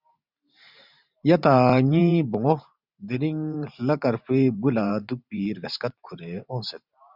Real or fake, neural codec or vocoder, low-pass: fake; vocoder, 44.1 kHz, 128 mel bands every 512 samples, BigVGAN v2; 5.4 kHz